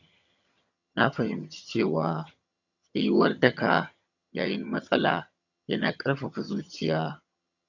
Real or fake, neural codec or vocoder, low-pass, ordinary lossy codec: fake; vocoder, 22.05 kHz, 80 mel bands, HiFi-GAN; 7.2 kHz; none